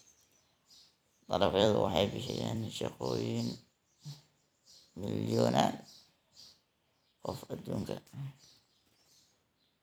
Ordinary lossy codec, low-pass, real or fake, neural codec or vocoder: none; none; fake; vocoder, 44.1 kHz, 128 mel bands every 256 samples, BigVGAN v2